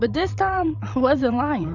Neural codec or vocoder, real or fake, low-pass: codec, 16 kHz, 8 kbps, FreqCodec, larger model; fake; 7.2 kHz